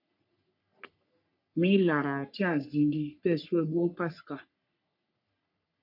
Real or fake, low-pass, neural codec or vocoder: fake; 5.4 kHz; codec, 44.1 kHz, 3.4 kbps, Pupu-Codec